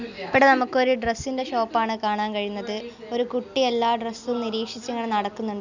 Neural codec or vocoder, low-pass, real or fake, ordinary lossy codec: none; 7.2 kHz; real; none